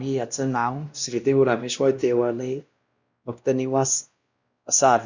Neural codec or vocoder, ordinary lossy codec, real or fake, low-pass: codec, 16 kHz, 1 kbps, X-Codec, WavLM features, trained on Multilingual LibriSpeech; Opus, 64 kbps; fake; 7.2 kHz